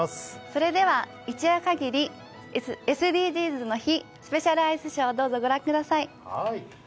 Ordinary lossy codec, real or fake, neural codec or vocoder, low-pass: none; real; none; none